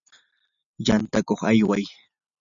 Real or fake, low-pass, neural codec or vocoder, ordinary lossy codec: real; 7.2 kHz; none; MP3, 96 kbps